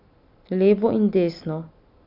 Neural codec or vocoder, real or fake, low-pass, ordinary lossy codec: none; real; 5.4 kHz; none